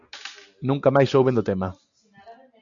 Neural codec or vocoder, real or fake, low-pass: none; real; 7.2 kHz